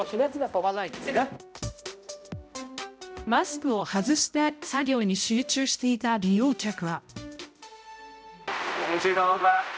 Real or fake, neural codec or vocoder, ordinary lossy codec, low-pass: fake; codec, 16 kHz, 0.5 kbps, X-Codec, HuBERT features, trained on balanced general audio; none; none